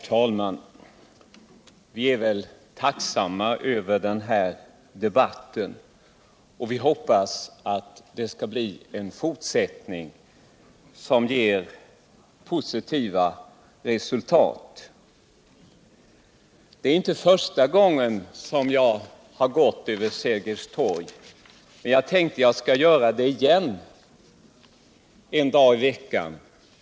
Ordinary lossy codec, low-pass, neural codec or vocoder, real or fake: none; none; none; real